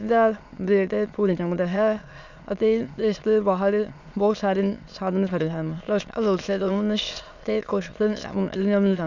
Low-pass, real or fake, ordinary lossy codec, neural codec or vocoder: 7.2 kHz; fake; none; autoencoder, 22.05 kHz, a latent of 192 numbers a frame, VITS, trained on many speakers